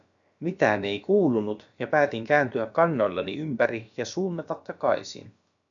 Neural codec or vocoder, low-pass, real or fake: codec, 16 kHz, about 1 kbps, DyCAST, with the encoder's durations; 7.2 kHz; fake